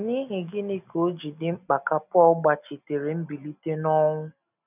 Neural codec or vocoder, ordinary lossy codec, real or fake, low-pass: none; none; real; 3.6 kHz